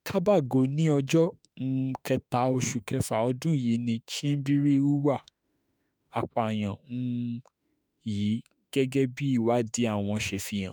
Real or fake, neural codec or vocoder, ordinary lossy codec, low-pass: fake; autoencoder, 48 kHz, 32 numbers a frame, DAC-VAE, trained on Japanese speech; none; none